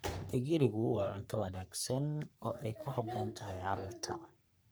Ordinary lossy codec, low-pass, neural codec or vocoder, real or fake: none; none; codec, 44.1 kHz, 3.4 kbps, Pupu-Codec; fake